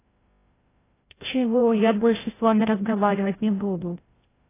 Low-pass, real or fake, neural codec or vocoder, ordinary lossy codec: 3.6 kHz; fake; codec, 16 kHz, 0.5 kbps, FreqCodec, larger model; AAC, 16 kbps